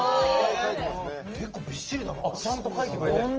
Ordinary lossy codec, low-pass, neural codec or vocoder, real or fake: Opus, 24 kbps; 7.2 kHz; none; real